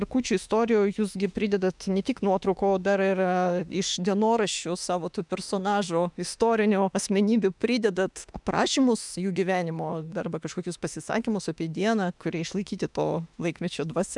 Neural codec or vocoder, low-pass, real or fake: autoencoder, 48 kHz, 32 numbers a frame, DAC-VAE, trained on Japanese speech; 10.8 kHz; fake